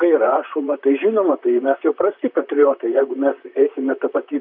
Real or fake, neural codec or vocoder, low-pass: fake; vocoder, 44.1 kHz, 128 mel bands, Pupu-Vocoder; 5.4 kHz